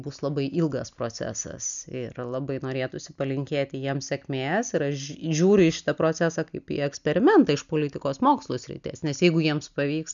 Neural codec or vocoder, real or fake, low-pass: none; real; 7.2 kHz